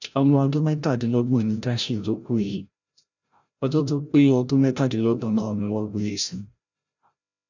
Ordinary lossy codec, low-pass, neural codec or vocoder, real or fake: none; 7.2 kHz; codec, 16 kHz, 0.5 kbps, FreqCodec, larger model; fake